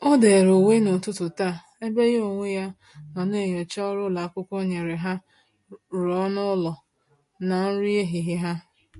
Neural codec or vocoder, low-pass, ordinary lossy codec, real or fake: none; 14.4 kHz; MP3, 48 kbps; real